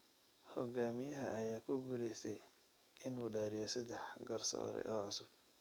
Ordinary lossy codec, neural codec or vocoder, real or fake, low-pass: none; codec, 44.1 kHz, 7.8 kbps, DAC; fake; none